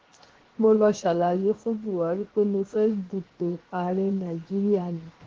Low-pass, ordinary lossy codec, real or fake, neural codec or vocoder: 7.2 kHz; Opus, 16 kbps; fake; codec, 16 kHz, 0.7 kbps, FocalCodec